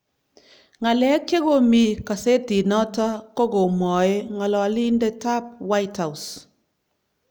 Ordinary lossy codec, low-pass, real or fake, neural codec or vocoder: none; none; real; none